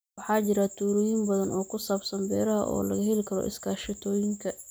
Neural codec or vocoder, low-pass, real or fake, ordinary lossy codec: none; none; real; none